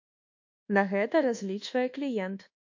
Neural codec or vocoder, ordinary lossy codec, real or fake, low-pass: codec, 24 kHz, 1.2 kbps, DualCodec; AAC, 48 kbps; fake; 7.2 kHz